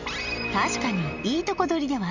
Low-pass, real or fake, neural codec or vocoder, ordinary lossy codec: 7.2 kHz; real; none; none